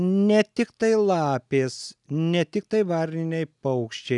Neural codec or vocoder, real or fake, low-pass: none; real; 10.8 kHz